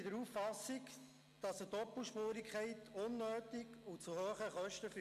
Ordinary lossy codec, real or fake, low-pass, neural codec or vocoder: MP3, 64 kbps; real; 14.4 kHz; none